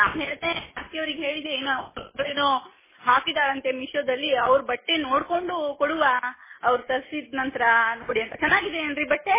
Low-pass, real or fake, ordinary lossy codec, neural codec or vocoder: 3.6 kHz; real; MP3, 16 kbps; none